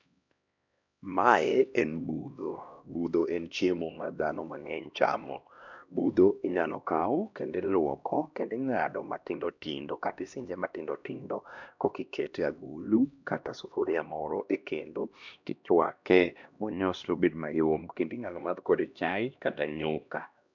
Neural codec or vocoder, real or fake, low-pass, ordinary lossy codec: codec, 16 kHz, 1 kbps, X-Codec, HuBERT features, trained on LibriSpeech; fake; 7.2 kHz; none